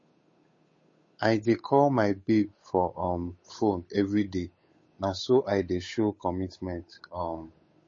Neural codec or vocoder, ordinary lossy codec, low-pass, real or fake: codec, 16 kHz, 8 kbps, FunCodec, trained on Chinese and English, 25 frames a second; MP3, 32 kbps; 7.2 kHz; fake